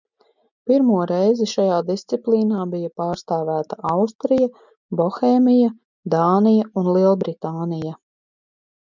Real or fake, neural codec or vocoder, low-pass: real; none; 7.2 kHz